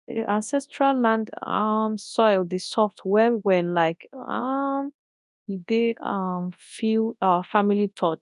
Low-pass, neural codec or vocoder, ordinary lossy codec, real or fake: 10.8 kHz; codec, 24 kHz, 0.9 kbps, WavTokenizer, large speech release; none; fake